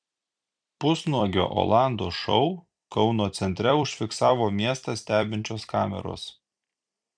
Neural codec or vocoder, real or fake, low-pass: vocoder, 44.1 kHz, 128 mel bands every 256 samples, BigVGAN v2; fake; 9.9 kHz